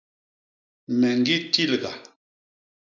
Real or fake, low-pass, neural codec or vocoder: real; 7.2 kHz; none